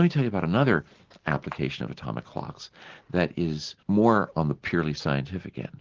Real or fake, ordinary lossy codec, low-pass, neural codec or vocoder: real; Opus, 16 kbps; 7.2 kHz; none